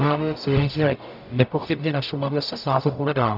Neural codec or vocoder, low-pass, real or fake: codec, 44.1 kHz, 0.9 kbps, DAC; 5.4 kHz; fake